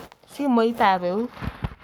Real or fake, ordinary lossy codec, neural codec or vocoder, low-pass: fake; none; codec, 44.1 kHz, 7.8 kbps, Pupu-Codec; none